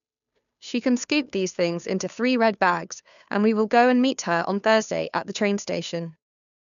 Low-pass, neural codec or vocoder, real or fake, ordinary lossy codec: 7.2 kHz; codec, 16 kHz, 2 kbps, FunCodec, trained on Chinese and English, 25 frames a second; fake; none